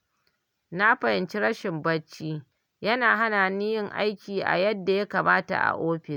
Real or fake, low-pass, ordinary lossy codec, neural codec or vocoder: real; 19.8 kHz; none; none